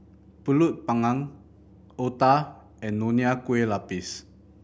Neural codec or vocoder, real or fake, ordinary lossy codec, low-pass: none; real; none; none